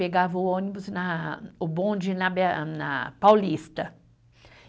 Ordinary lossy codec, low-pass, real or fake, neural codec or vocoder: none; none; real; none